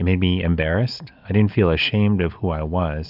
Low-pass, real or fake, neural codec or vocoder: 5.4 kHz; real; none